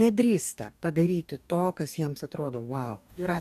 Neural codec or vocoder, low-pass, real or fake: codec, 44.1 kHz, 2.6 kbps, DAC; 14.4 kHz; fake